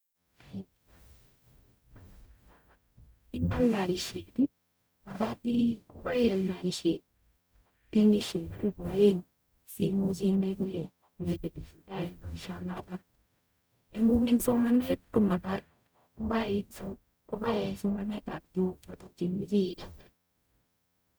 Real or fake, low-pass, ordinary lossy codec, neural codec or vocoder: fake; none; none; codec, 44.1 kHz, 0.9 kbps, DAC